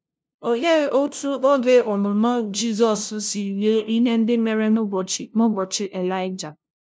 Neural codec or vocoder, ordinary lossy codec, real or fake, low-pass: codec, 16 kHz, 0.5 kbps, FunCodec, trained on LibriTTS, 25 frames a second; none; fake; none